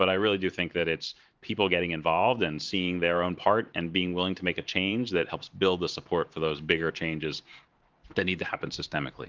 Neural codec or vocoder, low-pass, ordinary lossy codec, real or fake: vocoder, 44.1 kHz, 128 mel bands every 512 samples, BigVGAN v2; 7.2 kHz; Opus, 24 kbps; fake